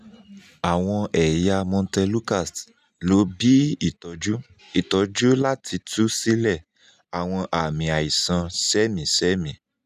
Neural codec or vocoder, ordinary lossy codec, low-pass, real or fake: none; none; 14.4 kHz; real